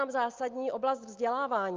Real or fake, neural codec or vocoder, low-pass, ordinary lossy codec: real; none; 7.2 kHz; Opus, 24 kbps